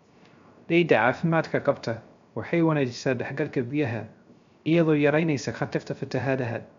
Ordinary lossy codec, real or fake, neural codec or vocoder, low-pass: MP3, 64 kbps; fake; codec, 16 kHz, 0.3 kbps, FocalCodec; 7.2 kHz